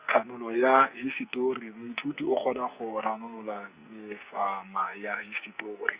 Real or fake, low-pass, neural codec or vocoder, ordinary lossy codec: fake; 3.6 kHz; codec, 44.1 kHz, 2.6 kbps, SNAC; Opus, 64 kbps